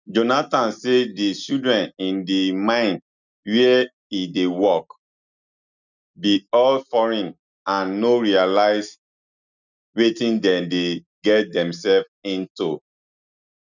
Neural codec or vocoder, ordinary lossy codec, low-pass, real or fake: none; none; 7.2 kHz; real